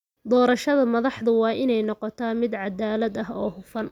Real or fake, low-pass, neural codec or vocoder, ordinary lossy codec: real; 19.8 kHz; none; none